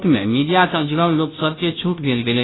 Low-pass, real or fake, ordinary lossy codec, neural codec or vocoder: 7.2 kHz; fake; AAC, 16 kbps; codec, 16 kHz, 0.5 kbps, FunCodec, trained on Chinese and English, 25 frames a second